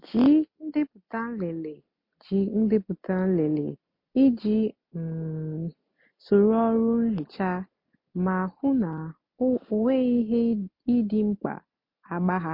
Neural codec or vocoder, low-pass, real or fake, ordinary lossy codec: none; 5.4 kHz; real; MP3, 32 kbps